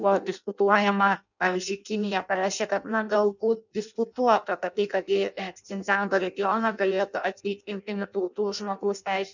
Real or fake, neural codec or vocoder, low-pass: fake; codec, 16 kHz in and 24 kHz out, 0.6 kbps, FireRedTTS-2 codec; 7.2 kHz